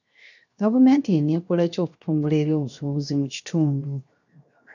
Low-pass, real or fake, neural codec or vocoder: 7.2 kHz; fake; codec, 16 kHz, 0.7 kbps, FocalCodec